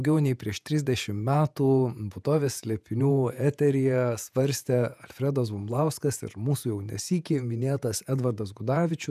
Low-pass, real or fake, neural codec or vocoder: 14.4 kHz; fake; vocoder, 48 kHz, 128 mel bands, Vocos